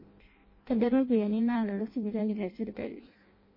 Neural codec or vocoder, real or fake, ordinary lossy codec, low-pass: codec, 16 kHz in and 24 kHz out, 0.6 kbps, FireRedTTS-2 codec; fake; MP3, 24 kbps; 5.4 kHz